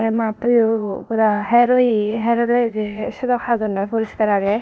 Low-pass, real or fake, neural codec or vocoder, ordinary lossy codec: none; fake; codec, 16 kHz, 0.8 kbps, ZipCodec; none